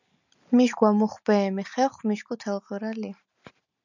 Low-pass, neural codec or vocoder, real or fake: 7.2 kHz; none; real